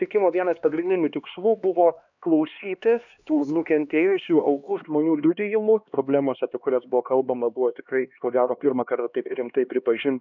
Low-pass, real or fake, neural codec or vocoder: 7.2 kHz; fake; codec, 16 kHz, 2 kbps, X-Codec, HuBERT features, trained on LibriSpeech